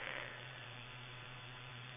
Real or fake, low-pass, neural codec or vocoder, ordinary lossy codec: fake; 3.6 kHz; vocoder, 22.05 kHz, 80 mel bands, WaveNeXt; none